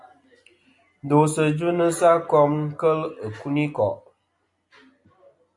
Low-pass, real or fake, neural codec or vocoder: 10.8 kHz; real; none